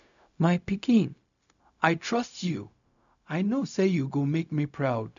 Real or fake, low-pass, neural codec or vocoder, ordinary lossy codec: fake; 7.2 kHz; codec, 16 kHz, 0.4 kbps, LongCat-Audio-Codec; none